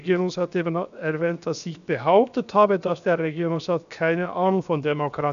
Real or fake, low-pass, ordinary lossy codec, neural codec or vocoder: fake; 7.2 kHz; none; codec, 16 kHz, 0.7 kbps, FocalCodec